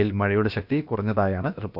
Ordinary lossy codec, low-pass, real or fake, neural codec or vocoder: none; 5.4 kHz; fake; codec, 16 kHz, about 1 kbps, DyCAST, with the encoder's durations